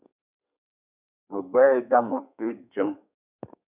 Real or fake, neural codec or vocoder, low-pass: fake; codec, 32 kHz, 1.9 kbps, SNAC; 3.6 kHz